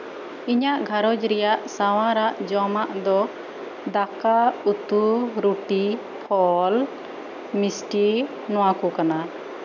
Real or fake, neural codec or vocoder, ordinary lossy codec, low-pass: real; none; none; 7.2 kHz